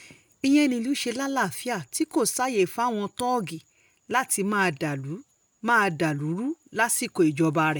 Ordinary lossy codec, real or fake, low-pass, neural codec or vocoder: none; real; none; none